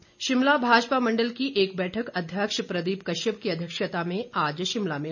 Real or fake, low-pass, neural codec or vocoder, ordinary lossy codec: real; 7.2 kHz; none; none